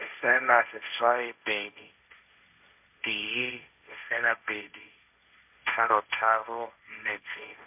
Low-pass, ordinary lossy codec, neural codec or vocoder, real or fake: 3.6 kHz; MP3, 32 kbps; codec, 16 kHz, 1.1 kbps, Voila-Tokenizer; fake